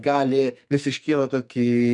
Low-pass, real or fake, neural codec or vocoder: 10.8 kHz; fake; codec, 44.1 kHz, 2.6 kbps, SNAC